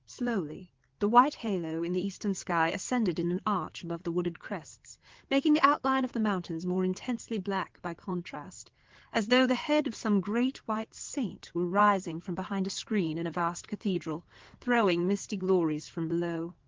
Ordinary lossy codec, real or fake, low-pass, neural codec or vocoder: Opus, 16 kbps; fake; 7.2 kHz; codec, 16 kHz in and 24 kHz out, 2.2 kbps, FireRedTTS-2 codec